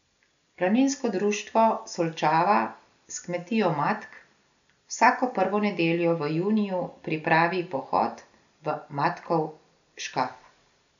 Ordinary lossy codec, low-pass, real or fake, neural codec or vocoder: none; 7.2 kHz; real; none